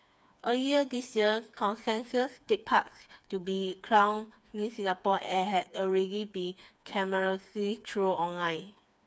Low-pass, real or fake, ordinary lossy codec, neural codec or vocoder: none; fake; none; codec, 16 kHz, 4 kbps, FreqCodec, smaller model